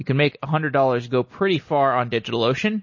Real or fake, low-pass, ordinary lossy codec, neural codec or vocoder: real; 7.2 kHz; MP3, 32 kbps; none